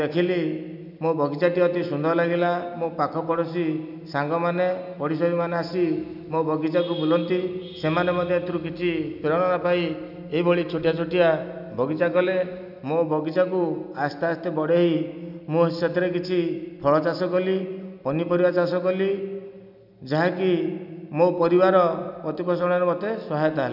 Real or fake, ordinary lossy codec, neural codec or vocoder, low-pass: real; none; none; 5.4 kHz